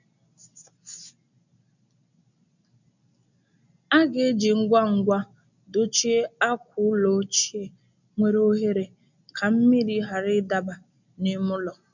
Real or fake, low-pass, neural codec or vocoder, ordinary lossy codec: real; 7.2 kHz; none; none